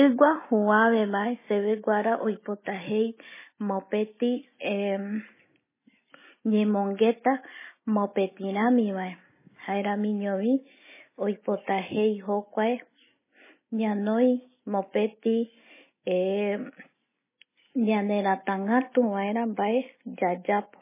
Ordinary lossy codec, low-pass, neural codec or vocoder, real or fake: MP3, 16 kbps; 3.6 kHz; none; real